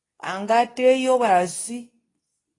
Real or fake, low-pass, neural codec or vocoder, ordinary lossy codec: fake; 10.8 kHz; codec, 24 kHz, 0.9 kbps, WavTokenizer, medium speech release version 2; AAC, 32 kbps